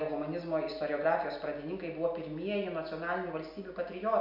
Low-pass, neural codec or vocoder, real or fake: 5.4 kHz; none; real